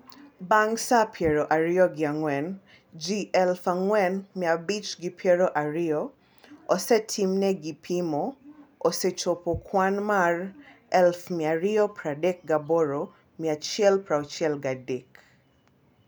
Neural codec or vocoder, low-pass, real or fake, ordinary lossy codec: none; none; real; none